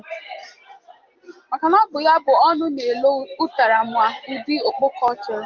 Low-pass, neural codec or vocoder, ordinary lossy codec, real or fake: 7.2 kHz; none; Opus, 32 kbps; real